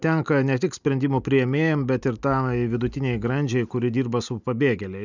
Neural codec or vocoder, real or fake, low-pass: none; real; 7.2 kHz